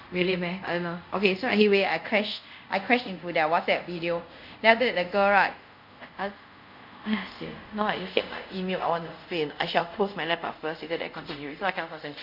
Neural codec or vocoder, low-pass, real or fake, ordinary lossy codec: codec, 24 kHz, 0.5 kbps, DualCodec; 5.4 kHz; fake; none